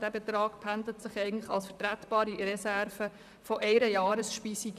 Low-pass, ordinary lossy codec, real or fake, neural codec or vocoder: 14.4 kHz; none; fake; vocoder, 44.1 kHz, 128 mel bands, Pupu-Vocoder